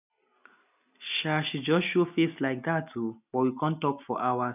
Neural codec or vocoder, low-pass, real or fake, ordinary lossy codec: none; 3.6 kHz; real; none